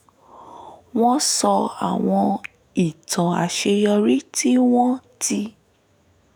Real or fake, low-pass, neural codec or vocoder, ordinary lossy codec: fake; none; autoencoder, 48 kHz, 128 numbers a frame, DAC-VAE, trained on Japanese speech; none